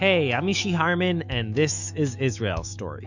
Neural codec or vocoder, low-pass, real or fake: autoencoder, 48 kHz, 128 numbers a frame, DAC-VAE, trained on Japanese speech; 7.2 kHz; fake